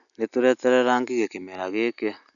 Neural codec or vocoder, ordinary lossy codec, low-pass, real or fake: none; none; 7.2 kHz; real